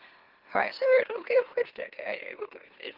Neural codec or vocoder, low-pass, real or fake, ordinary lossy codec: autoencoder, 44.1 kHz, a latent of 192 numbers a frame, MeloTTS; 5.4 kHz; fake; Opus, 16 kbps